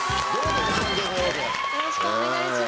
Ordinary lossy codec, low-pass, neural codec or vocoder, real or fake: none; none; none; real